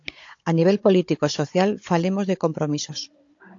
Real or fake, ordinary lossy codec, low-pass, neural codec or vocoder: fake; AAC, 48 kbps; 7.2 kHz; codec, 16 kHz, 16 kbps, FunCodec, trained on Chinese and English, 50 frames a second